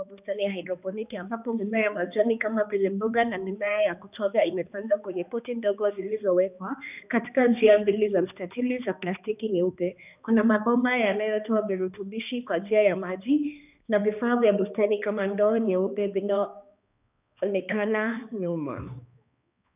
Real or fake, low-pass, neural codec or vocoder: fake; 3.6 kHz; codec, 16 kHz, 2 kbps, X-Codec, HuBERT features, trained on balanced general audio